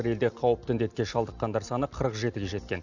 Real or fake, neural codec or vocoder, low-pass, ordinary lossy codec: real; none; 7.2 kHz; none